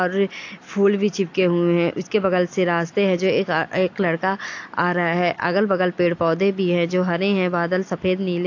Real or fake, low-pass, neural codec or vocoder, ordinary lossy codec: real; 7.2 kHz; none; AAC, 48 kbps